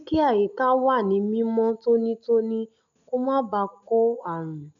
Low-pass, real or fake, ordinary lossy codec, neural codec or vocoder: 7.2 kHz; real; none; none